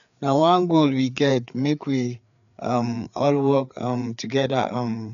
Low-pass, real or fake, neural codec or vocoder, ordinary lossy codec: 7.2 kHz; fake; codec, 16 kHz, 4 kbps, FreqCodec, larger model; none